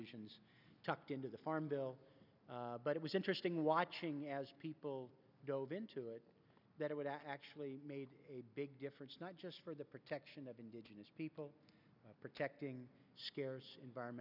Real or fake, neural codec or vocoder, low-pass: real; none; 5.4 kHz